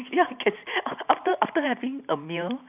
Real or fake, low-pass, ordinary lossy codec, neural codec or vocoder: fake; 3.6 kHz; none; vocoder, 44.1 kHz, 128 mel bands every 512 samples, BigVGAN v2